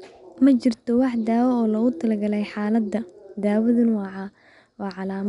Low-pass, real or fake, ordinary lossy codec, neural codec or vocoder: 10.8 kHz; real; none; none